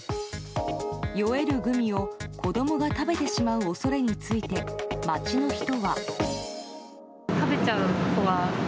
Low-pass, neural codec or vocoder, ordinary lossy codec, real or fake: none; none; none; real